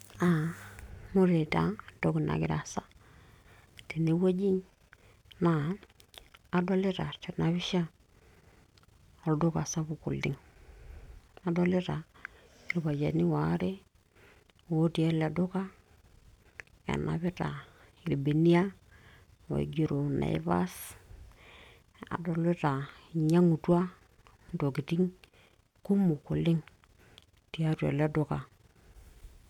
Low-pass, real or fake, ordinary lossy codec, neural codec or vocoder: 19.8 kHz; real; none; none